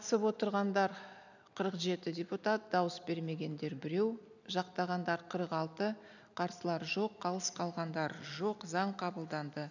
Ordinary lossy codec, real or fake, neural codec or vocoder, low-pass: none; real; none; 7.2 kHz